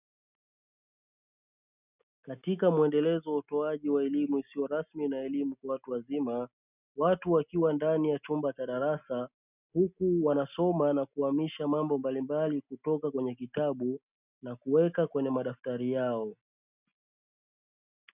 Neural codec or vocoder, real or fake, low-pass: none; real; 3.6 kHz